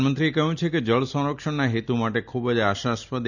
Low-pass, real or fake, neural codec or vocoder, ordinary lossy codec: 7.2 kHz; real; none; none